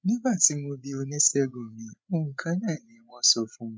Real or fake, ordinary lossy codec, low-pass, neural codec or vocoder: fake; none; none; codec, 16 kHz, 8 kbps, FreqCodec, larger model